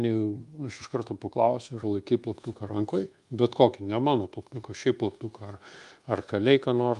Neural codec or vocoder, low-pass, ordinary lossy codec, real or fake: codec, 24 kHz, 1.2 kbps, DualCodec; 10.8 kHz; Opus, 32 kbps; fake